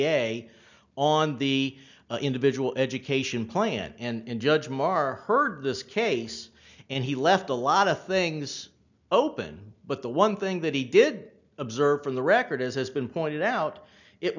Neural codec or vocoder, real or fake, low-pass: none; real; 7.2 kHz